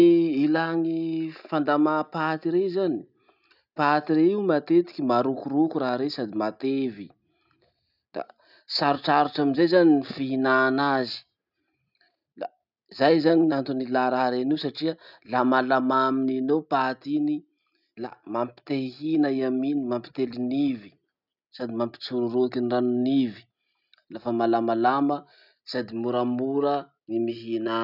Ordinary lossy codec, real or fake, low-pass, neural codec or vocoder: none; real; 5.4 kHz; none